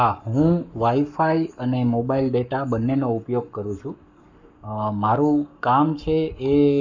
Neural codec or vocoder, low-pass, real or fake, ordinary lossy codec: codec, 44.1 kHz, 7.8 kbps, Pupu-Codec; 7.2 kHz; fake; none